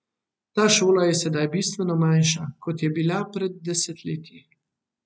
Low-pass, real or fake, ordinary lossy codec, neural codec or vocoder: none; real; none; none